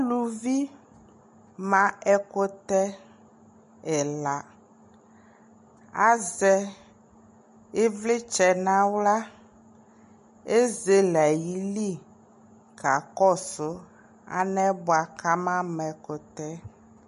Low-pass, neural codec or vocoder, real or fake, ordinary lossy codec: 14.4 kHz; none; real; MP3, 48 kbps